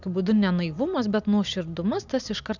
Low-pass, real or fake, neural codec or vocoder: 7.2 kHz; real; none